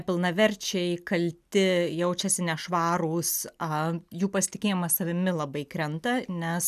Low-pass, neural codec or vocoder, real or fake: 14.4 kHz; none; real